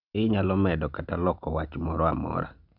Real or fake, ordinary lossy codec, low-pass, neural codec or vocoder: real; none; 5.4 kHz; none